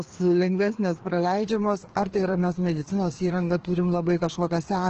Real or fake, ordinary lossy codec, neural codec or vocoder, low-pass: fake; Opus, 16 kbps; codec, 16 kHz, 2 kbps, FreqCodec, larger model; 7.2 kHz